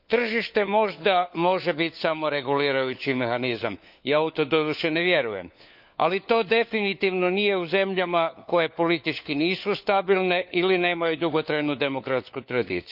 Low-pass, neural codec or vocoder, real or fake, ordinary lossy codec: 5.4 kHz; codec, 16 kHz, 4 kbps, FunCodec, trained on LibriTTS, 50 frames a second; fake; none